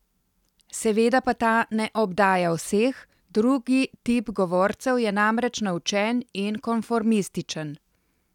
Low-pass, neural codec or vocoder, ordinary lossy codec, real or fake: 19.8 kHz; none; none; real